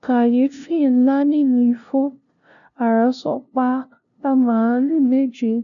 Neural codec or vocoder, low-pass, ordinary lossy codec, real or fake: codec, 16 kHz, 0.5 kbps, FunCodec, trained on LibriTTS, 25 frames a second; 7.2 kHz; none; fake